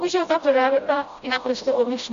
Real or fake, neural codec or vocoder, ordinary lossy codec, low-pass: fake; codec, 16 kHz, 0.5 kbps, FreqCodec, smaller model; MP3, 48 kbps; 7.2 kHz